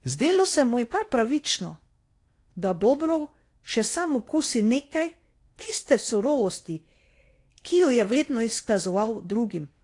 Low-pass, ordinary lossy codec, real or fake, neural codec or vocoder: 10.8 kHz; AAC, 48 kbps; fake; codec, 16 kHz in and 24 kHz out, 0.8 kbps, FocalCodec, streaming, 65536 codes